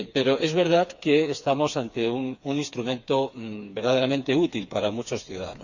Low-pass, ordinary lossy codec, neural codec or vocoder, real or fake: 7.2 kHz; none; codec, 16 kHz, 4 kbps, FreqCodec, smaller model; fake